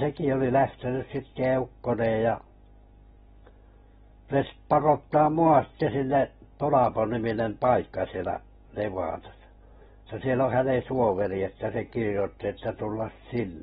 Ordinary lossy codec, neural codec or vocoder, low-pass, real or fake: AAC, 16 kbps; none; 10.8 kHz; real